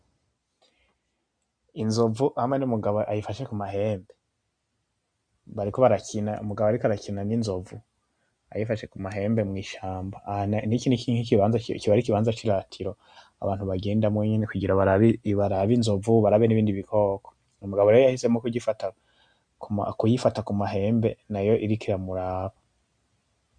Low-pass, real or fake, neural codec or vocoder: 9.9 kHz; real; none